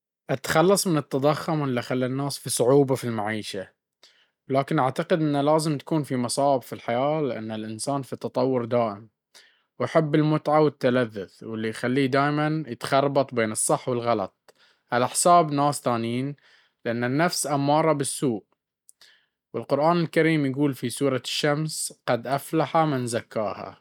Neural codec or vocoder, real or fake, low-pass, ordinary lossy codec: none; real; 19.8 kHz; none